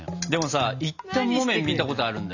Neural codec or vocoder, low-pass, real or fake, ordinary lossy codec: none; 7.2 kHz; real; none